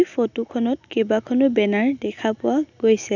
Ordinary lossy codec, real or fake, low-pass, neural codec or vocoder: none; real; 7.2 kHz; none